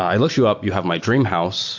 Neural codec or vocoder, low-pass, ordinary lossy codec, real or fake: none; 7.2 kHz; MP3, 48 kbps; real